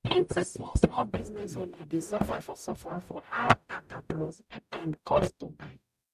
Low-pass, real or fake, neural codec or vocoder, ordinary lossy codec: 14.4 kHz; fake; codec, 44.1 kHz, 0.9 kbps, DAC; none